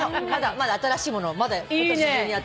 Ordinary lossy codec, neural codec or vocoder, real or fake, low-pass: none; none; real; none